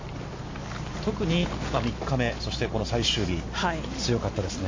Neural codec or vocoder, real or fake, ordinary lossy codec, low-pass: none; real; MP3, 32 kbps; 7.2 kHz